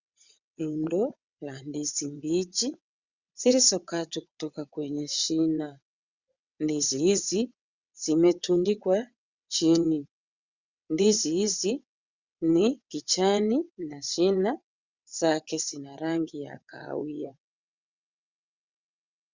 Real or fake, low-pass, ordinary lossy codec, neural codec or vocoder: fake; 7.2 kHz; Opus, 64 kbps; vocoder, 22.05 kHz, 80 mel bands, WaveNeXt